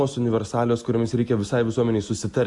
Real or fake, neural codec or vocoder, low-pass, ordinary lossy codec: real; none; 10.8 kHz; MP3, 96 kbps